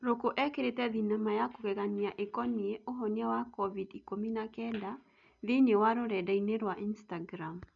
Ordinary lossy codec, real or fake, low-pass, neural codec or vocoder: none; real; 7.2 kHz; none